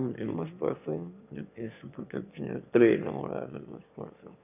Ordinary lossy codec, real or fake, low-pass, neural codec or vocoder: none; fake; 3.6 kHz; autoencoder, 22.05 kHz, a latent of 192 numbers a frame, VITS, trained on one speaker